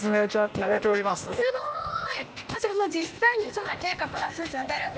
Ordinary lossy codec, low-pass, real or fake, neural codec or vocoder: none; none; fake; codec, 16 kHz, 0.8 kbps, ZipCodec